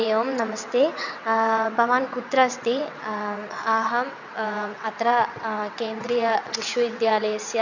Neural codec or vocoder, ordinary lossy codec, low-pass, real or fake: vocoder, 22.05 kHz, 80 mel bands, WaveNeXt; none; 7.2 kHz; fake